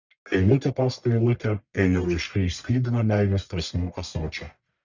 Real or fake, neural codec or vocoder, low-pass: fake; codec, 44.1 kHz, 1.7 kbps, Pupu-Codec; 7.2 kHz